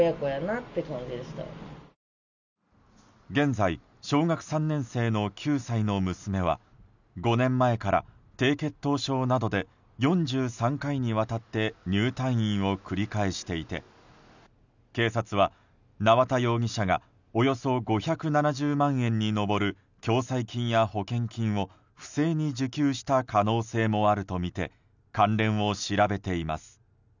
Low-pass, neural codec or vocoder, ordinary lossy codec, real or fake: 7.2 kHz; none; none; real